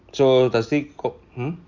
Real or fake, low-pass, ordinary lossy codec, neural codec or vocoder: real; 7.2 kHz; Opus, 64 kbps; none